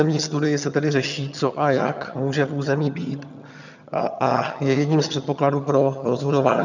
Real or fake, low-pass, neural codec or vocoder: fake; 7.2 kHz; vocoder, 22.05 kHz, 80 mel bands, HiFi-GAN